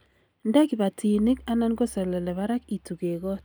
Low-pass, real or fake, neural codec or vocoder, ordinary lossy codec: none; real; none; none